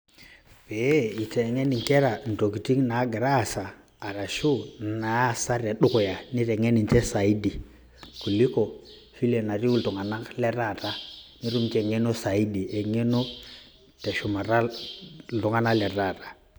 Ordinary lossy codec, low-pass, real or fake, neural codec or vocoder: none; none; real; none